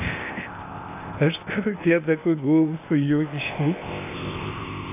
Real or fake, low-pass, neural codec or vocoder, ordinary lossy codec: fake; 3.6 kHz; codec, 16 kHz, 0.8 kbps, ZipCodec; AAC, 24 kbps